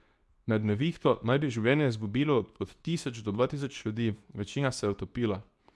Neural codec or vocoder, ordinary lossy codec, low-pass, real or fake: codec, 24 kHz, 0.9 kbps, WavTokenizer, medium speech release version 2; none; none; fake